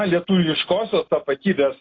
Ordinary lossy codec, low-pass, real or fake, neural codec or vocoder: AAC, 32 kbps; 7.2 kHz; real; none